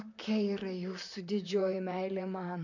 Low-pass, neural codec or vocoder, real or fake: 7.2 kHz; vocoder, 44.1 kHz, 128 mel bands, Pupu-Vocoder; fake